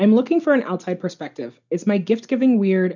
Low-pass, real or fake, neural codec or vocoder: 7.2 kHz; real; none